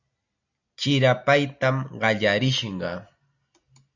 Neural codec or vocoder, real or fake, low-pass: none; real; 7.2 kHz